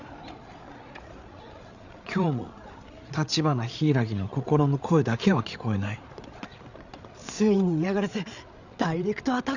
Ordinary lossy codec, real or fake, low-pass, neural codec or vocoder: none; fake; 7.2 kHz; codec, 16 kHz, 8 kbps, FreqCodec, larger model